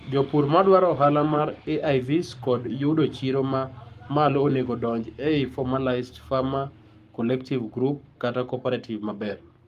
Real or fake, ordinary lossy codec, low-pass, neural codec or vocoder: fake; Opus, 32 kbps; 14.4 kHz; codec, 44.1 kHz, 7.8 kbps, Pupu-Codec